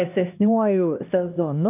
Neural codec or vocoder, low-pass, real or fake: codec, 16 kHz, 1 kbps, X-Codec, HuBERT features, trained on LibriSpeech; 3.6 kHz; fake